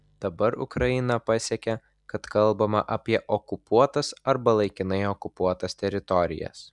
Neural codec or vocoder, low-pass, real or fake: none; 10.8 kHz; real